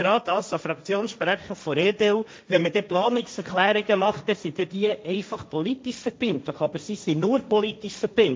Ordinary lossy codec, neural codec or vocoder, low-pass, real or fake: none; codec, 16 kHz, 1.1 kbps, Voila-Tokenizer; none; fake